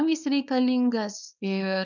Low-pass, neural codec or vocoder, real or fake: 7.2 kHz; codec, 24 kHz, 0.9 kbps, WavTokenizer, small release; fake